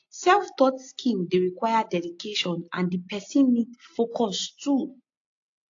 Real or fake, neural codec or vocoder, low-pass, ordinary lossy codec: real; none; 7.2 kHz; AAC, 48 kbps